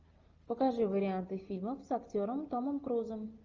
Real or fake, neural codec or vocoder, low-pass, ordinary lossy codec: real; none; 7.2 kHz; Opus, 24 kbps